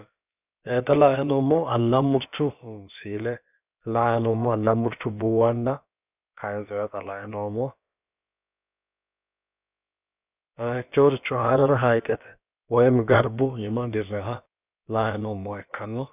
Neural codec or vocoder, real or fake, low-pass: codec, 16 kHz, about 1 kbps, DyCAST, with the encoder's durations; fake; 3.6 kHz